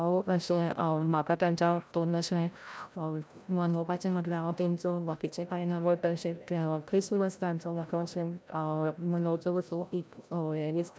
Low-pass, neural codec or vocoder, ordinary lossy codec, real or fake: none; codec, 16 kHz, 0.5 kbps, FreqCodec, larger model; none; fake